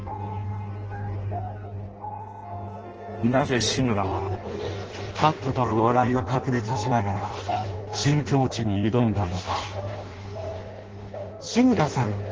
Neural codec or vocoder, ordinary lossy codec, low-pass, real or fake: codec, 16 kHz in and 24 kHz out, 0.6 kbps, FireRedTTS-2 codec; Opus, 16 kbps; 7.2 kHz; fake